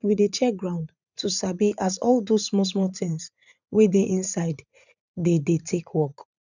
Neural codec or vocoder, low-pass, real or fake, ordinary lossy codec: vocoder, 44.1 kHz, 80 mel bands, Vocos; 7.2 kHz; fake; none